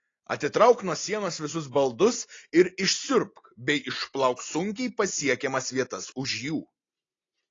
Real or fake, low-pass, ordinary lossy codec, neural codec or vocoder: real; 7.2 kHz; AAC, 32 kbps; none